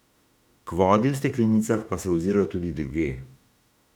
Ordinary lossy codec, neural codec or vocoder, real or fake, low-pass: none; autoencoder, 48 kHz, 32 numbers a frame, DAC-VAE, trained on Japanese speech; fake; 19.8 kHz